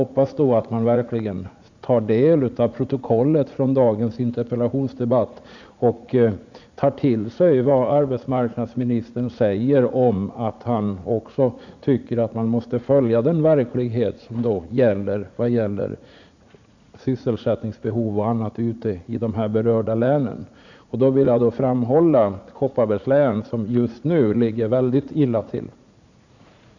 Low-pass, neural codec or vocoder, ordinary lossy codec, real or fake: 7.2 kHz; vocoder, 44.1 kHz, 80 mel bands, Vocos; none; fake